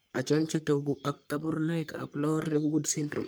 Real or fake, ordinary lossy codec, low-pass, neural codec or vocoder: fake; none; none; codec, 44.1 kHz, 3.4 kbps, Pupu-Codec